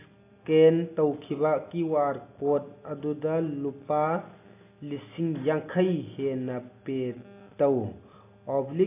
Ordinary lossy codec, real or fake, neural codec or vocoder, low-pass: AAC, 24 kbps; real; none; 3.6 kHz